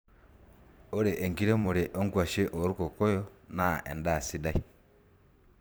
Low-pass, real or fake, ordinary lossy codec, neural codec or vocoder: none; fake; none; vocoder, 44.1 kHz, 128 mel bands every 512 samples, BigVGAN v2